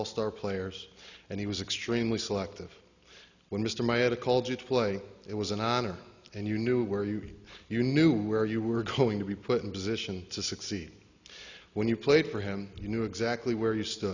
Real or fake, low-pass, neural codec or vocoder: real; 7.2 kHz; none